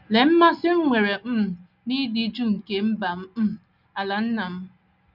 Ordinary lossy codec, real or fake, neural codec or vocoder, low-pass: none; real; none; 5.4 kHz